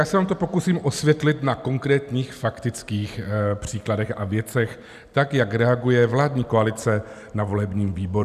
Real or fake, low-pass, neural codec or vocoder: real; 14.4 kHz; none